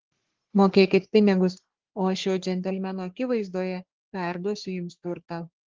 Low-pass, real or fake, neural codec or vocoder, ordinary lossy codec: 7.2 kHz; fake; codec, 44.1 kHz, 3.4 kbps, Pupu-Codec; Opus, 16 kbps